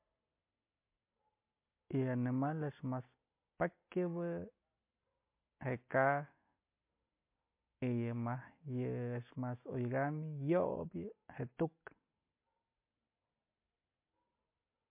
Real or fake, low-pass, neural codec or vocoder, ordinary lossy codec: real; 3.6 kHz; none; MP3, 24 kbps